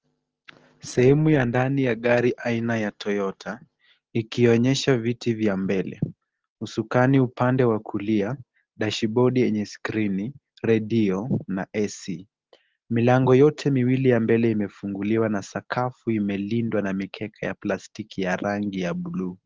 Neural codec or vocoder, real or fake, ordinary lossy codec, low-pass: none; real; Opus, 16 kbps; 7.2 kHz